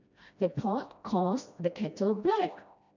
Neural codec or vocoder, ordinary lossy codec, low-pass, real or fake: codec, 16 kHz, 1 kbps, FreqCodec, smaller model; none; 7.2 kHz; fake